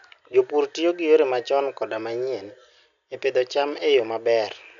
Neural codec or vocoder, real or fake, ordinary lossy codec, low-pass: none; real; none; 7.2 kHz